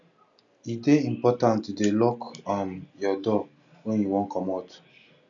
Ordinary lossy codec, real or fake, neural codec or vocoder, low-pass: none; real; none; 7.2 kHz